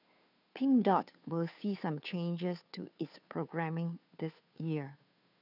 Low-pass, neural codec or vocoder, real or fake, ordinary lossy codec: 5.4 kHz; codec, 16 kHz, 2 kbps, FunCodec, trained on Chinese and English, 25 frames a second; fake; none